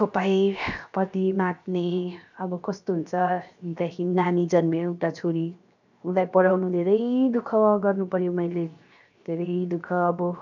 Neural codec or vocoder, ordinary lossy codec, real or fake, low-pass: codec, 16 kHz, 0.7 kbps, FocalCodec; none; fake; 7.2 kHz